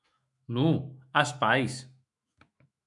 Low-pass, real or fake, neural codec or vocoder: 10.8 kHz; fake; autoencoder, 48 kHz, 128 numbers a frame, DAC-VAE, trained on Japanese speech